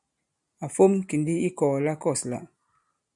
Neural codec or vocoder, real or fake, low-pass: none; real; 10.8 kHz